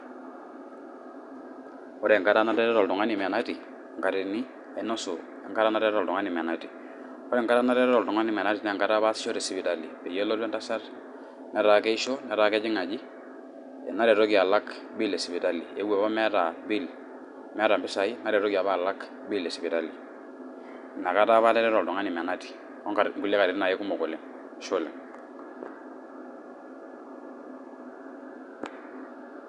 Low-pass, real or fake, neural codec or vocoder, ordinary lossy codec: 10.8 kHz; real; none; none